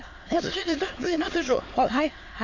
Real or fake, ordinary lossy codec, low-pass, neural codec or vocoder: fake; AAC, 48 kbps; 7.2 kHz; autoencoder, 22.05 kHz, a latent of 192 numbers a frame, VITS, trained on many speakers